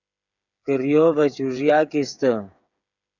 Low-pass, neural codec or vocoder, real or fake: 7.2 kHz; codec, 16 kHz, 8 kbps, FreqCodec, smaller model; fake